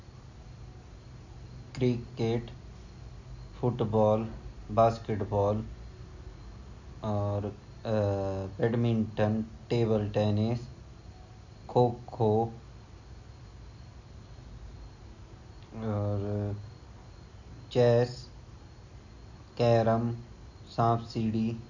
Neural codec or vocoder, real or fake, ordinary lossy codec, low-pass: none; real; none; 7.2 kHz